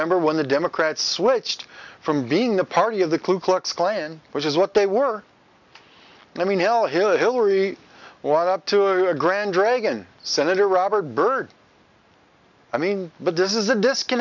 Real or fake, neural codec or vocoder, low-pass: real; none; 7.2 kHz